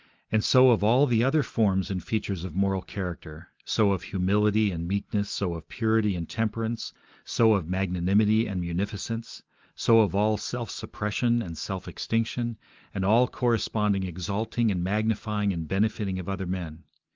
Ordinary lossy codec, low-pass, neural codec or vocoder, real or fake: Opus, 32 kbps; 7.2 kHz; none; real